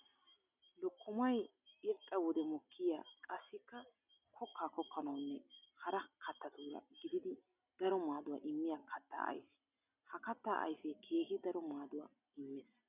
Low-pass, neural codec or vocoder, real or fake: 3.6 kHz; none; real